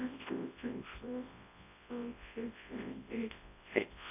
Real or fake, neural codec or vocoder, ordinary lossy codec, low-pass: fake; codec, 24 kHz, 0.9 kbps, WavTokenizer, large speech release; AAC, 24 kbps; 3.6 kHz